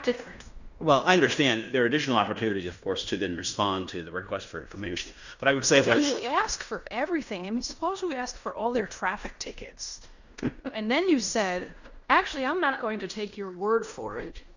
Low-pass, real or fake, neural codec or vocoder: 7.2 kHz; fake; codec, 16 kHz in and 24 kHz out, 0.9 kbps, LongCat-Audio-Codec, fine tuned four codebook decoder